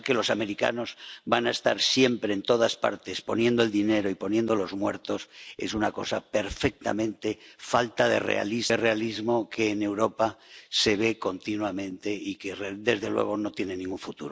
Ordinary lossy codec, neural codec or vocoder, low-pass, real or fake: none; none; none; real